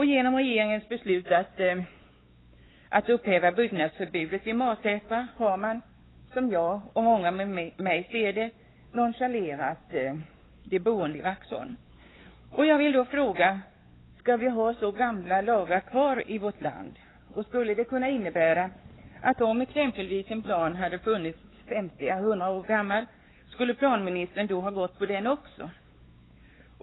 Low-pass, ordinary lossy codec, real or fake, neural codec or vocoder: 7.2 kHz; AAC, 16 kbps; fake; codec, 16 kHz, 4 kbps, X-Codec, WavLM features, trained on Multilingual LibriSpeech